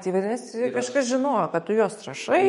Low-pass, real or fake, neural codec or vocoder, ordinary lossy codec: 19.8 kHz; fake; autoencoder, 48 kHz, 128 numbers a frame, DAC-VAE, trained on Japanese speech; MP3, 48 kbps